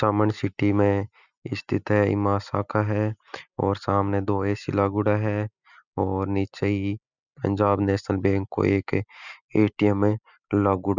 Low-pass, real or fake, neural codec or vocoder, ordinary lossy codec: 7.2 kHz; real; none; none